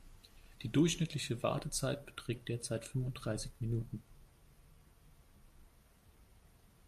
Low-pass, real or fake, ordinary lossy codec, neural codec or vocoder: 14.4 kHz; real; MP3, 64 kbps; none